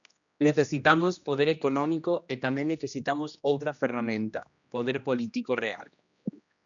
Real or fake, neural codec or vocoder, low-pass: fake; codec, 16 kHz, 1 kbps, X-Codec, HuBERT features, trained on general audio; 7.2 kHz